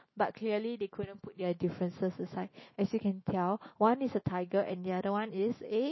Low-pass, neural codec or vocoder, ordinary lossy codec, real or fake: 7.2 kHz; autoencoder, 48 kHz, 128 numbers a frame, DAC-VAE, trained on Japanese speech; MP3, 24 kbps; fake